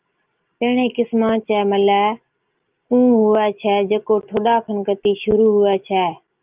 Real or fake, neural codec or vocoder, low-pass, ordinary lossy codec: real; none; 3.6 kHz; Opus, 32 kbps